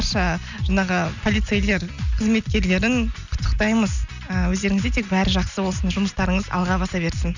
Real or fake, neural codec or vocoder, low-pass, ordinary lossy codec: real; none; 7.2 kHz; none